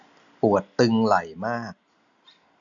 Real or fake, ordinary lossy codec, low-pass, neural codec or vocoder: real; none; 7.2 kHz; none